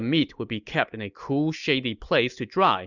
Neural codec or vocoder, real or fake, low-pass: none; real; 7.2 kHz